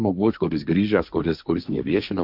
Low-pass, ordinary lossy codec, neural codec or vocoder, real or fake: 5.4 kHz; AAC, 48 kbps; codec, 16 kHz, 1.1 kbps, Voila-Tokenizer; fake